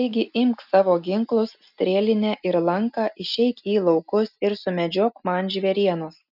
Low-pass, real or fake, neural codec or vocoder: 5.4 kHz; real; none